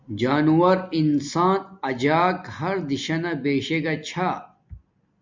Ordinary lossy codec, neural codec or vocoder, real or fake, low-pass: MP3, 64 kbps; none; real; 7.2 kHz